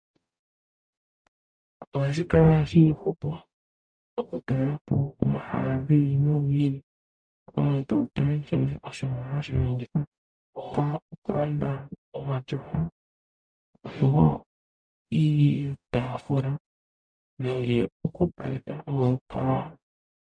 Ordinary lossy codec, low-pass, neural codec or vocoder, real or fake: MP3, 64 kbps; 9.9 kHz; codec, 44.1 kHz, 0.9 kbps, DAC; fake